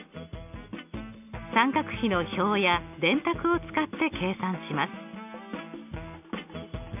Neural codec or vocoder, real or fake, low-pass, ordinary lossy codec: none; real; 3.6 kHz; none